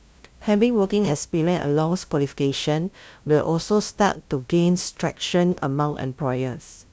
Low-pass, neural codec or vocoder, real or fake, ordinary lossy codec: none; codec, 16 kHz, 0.5 kbps, FunCodec, trained on LibriTTS, 25 frames a second; fake; none